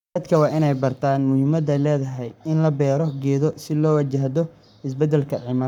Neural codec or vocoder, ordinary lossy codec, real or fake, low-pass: codec, 44.1 kHz, 7.8 kbps, Pupu-Codec; none; fake; 19.8 kHz